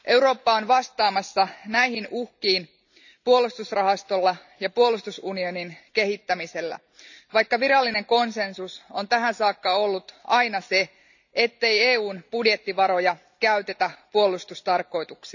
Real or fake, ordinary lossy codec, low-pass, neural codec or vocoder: real; none; 7.2 kHz; none